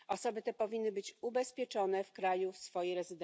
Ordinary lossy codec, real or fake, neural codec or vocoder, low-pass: none; real; none; none